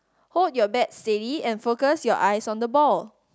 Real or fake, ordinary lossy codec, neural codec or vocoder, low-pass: real; none; none; none